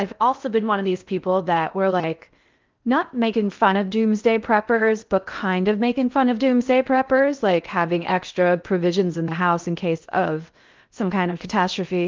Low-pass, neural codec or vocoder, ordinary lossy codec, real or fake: 7.2 kHz; codec, 16 kHz in and 24 kHz out, 0.6 kbps, FocalCodec, streaming, 4096 codes; Opus, 24 kbps; fake